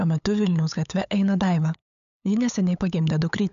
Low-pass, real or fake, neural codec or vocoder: 7.2 kHz; fake; codec, 16 kHz, 8 kbps, FunCodec, trained on LibriTTS, 25 frames a second